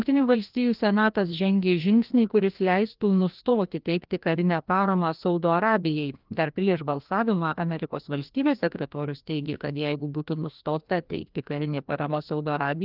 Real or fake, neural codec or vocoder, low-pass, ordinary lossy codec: fake; codec, 16 kHz, 1 kbps, FreqCodec, larger model; 5.4 kHz; Opus, 32 kbps